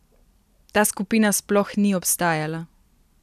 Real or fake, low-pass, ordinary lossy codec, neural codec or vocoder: real; 14.4 kHz; none; none